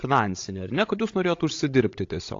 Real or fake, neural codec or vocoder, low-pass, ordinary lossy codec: fake; codec, 16 kHz, 16 kbps, FreqCodec, larger model; 7.2 kHz; AAC, 48 kbps